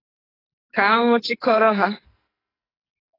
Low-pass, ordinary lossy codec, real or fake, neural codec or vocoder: 5.4 kHz; AAC, 24 kbps; fake; codec, 44.1 kHz, 2.6 kbps, SNAC